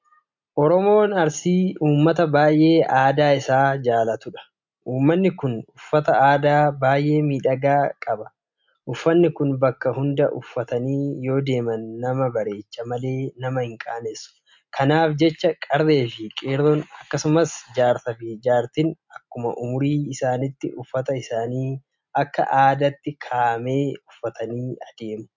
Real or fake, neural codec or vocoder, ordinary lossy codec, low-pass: real; none; MP3, 64 kbps; 7.2 kHz